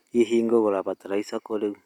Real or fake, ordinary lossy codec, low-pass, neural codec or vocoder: real; none; 19.8 kHz; none